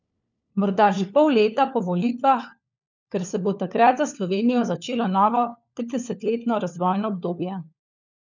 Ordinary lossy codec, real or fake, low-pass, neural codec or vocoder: none; fake; 7.2 kHz; codec, 16 kHz, 4 kbps, FunCodec, trained on LibriTTS, 50 frames a second